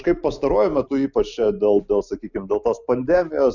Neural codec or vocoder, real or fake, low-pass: none; real; 7.2 kHz